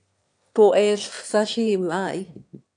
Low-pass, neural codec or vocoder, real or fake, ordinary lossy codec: 9.9 kHz; autoencoder, 22.05 kHz, a latent of 192 numbers a frame, VITS, trained on one speaker; fake; AAC, 64 kbps